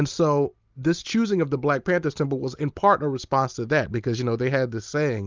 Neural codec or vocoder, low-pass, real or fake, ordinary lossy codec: none; 7.2 kHz; real; Opus, 24 kbps